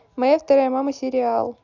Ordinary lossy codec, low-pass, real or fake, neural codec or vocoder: none; 7.2 kHz; real; none